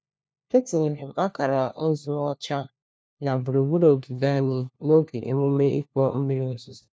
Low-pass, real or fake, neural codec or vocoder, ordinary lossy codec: none; fake; codec, 16 kHz, 1 kbps, FunCodec, trained on LibriTTS, 50 frames a second; none